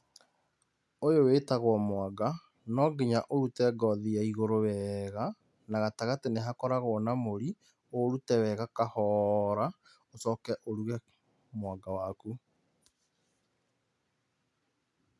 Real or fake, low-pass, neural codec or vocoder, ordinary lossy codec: real; none; none; none